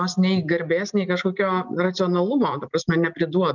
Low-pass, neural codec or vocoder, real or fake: 7.2 kHz; none; real